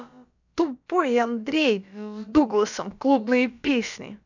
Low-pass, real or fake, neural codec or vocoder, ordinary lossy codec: 7.2 kHz; fake; codec, 16 kHz, about 1 kbps, DyCAST, with the encoder's durations; none